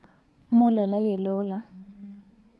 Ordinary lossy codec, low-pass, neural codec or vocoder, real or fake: none; none; codec, 24 kHz, 1 kbps, SNAC; fake